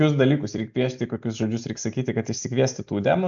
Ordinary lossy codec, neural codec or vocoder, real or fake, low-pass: AAC, 64 kbps; none; real; 7.2 kHz